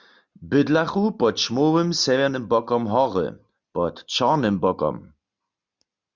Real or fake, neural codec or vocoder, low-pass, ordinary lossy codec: real; none; 7.2 kHz; Opus, 64 kbps